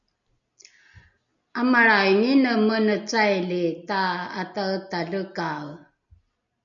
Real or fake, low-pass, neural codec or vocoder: real; 7.2 kHz; none